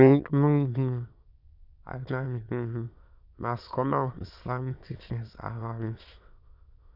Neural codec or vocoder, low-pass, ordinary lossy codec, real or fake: autoencoder, 22.05 kHz, a latent of 192 numbers a frame, VITS, trained on many speakers; 5.4 kHz; none; fake